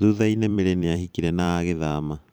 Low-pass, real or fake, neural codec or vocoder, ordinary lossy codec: none; fake; vocoder, 44.1 kHz, 128 mel bands every 512 samples, BigVGAN v2; none